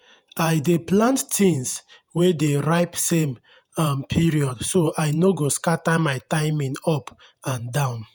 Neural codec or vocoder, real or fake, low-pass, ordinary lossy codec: vocoder, 48 kHz, 128 mel bands, Vocos; fake; none; none